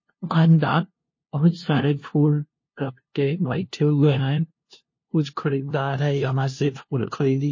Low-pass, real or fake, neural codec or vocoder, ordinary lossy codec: 7.2 kHz; fake; codec, 16 kHz, 0.5 kbps, FunCodec, trained on LibriTTS, 25 frames a second; MP3, 32 kbps